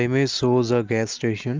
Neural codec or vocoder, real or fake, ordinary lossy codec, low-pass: none; real; Opus, 24 kbps; 7.2 kHz